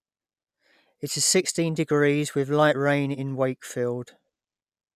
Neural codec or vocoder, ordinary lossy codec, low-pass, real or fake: none; none; 14.4 kHz; real